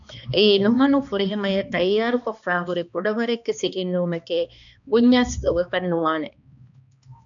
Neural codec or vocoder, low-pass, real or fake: codec, 16 kHz, 2 kbps, X-Codec, HuBERT features, trained on balanced general audio; 7.2 kHz; fake